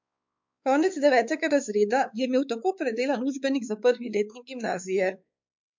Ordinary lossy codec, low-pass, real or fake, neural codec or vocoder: MP3, 64 kbps; 7.2 kHz; fake; codec, 16 kHz, 4 kbps, X-Codec, WavLM features, trained on Multilingual LibriSpeech